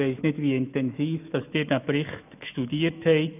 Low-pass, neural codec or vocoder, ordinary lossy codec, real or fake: 3.6 kHz; autoencoder, 48 kHz, 128 numbers a frame, DAC-VAE, trained on Japanese speech; none; fake